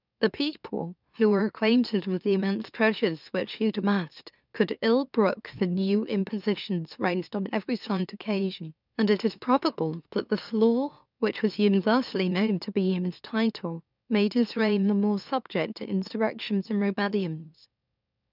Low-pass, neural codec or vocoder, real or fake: 5.4 kHz; autoencoder, 44.1 kHz, a latent of 192 numbers a frame, MeloTTS; fake